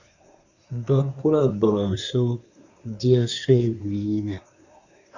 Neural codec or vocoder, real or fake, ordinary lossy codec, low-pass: codec, 24 kHz, 1 kbps, SNAC; fake; Opus, 64 kbps; 7.2 kHz